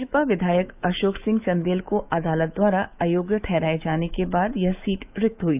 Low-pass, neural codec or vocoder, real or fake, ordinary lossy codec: 3.6 kHz; autoencoder, 48 kHz, 128 numbers a frame, DAC-VAE, trained on Japanese speech; fake; none